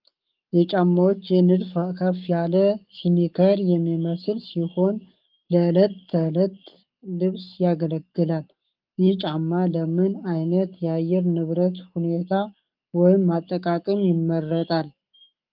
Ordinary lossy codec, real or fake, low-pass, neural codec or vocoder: Opus, 24 kbps; fake; 5.4 kHz; codec, 44.1 kHz, 7.8 kbps, Pupu-Codec